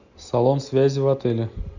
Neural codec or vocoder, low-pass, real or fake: none; 7.2 kHz; real